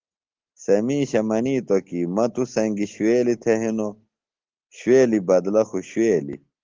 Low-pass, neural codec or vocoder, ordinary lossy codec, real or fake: 7.2 kHz; none; Opus, 16 kbps; real